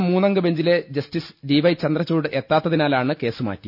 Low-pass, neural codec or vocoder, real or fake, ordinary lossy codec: 5.4 kHz; none; real; none